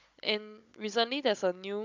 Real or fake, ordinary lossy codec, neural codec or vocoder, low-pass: fake; MP3, 64 kbps; codec, 16 kHz, 16 kbps, FunCodec, trained on Chinese and English, 50 frames a second; 7.2 kHz